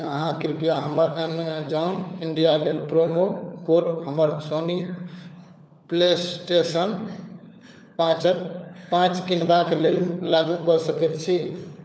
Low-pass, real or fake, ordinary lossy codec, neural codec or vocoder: none; fake; none; codec, 16 kHz, 4 kbps, FunCodec, trained on LibriTTS, 50 frames a second